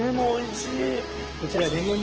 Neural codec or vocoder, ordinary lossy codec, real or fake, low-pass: autoencoder, 48 kHz, 128 numbers a frame, DAC-VAE, trained on Japanese speech; Opus, 16 kbps; fake; 7.2 kHz